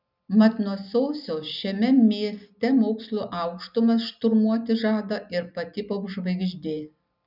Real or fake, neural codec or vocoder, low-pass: real; none; 5.4 kHz